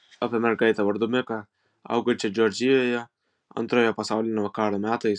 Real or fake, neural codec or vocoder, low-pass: real; none; 9.9 kHz